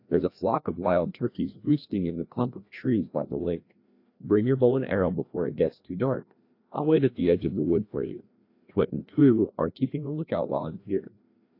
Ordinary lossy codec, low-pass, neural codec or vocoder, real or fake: AAC, 32 kbps; 5.4 kHz; codec, 16 kHz, 1 kbps, FreqCodec, larger model; fake